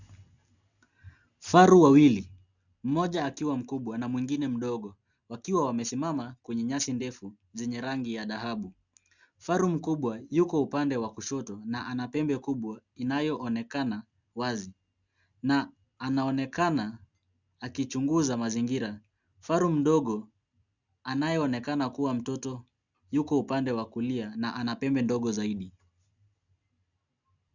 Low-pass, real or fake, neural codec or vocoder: 7.2 kHz; real; none